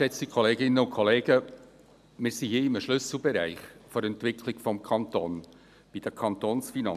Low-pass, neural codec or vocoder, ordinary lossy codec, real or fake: 14.4 kHz; none; none; real